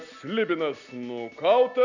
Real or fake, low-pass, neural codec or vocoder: real; 7.2 kHz; none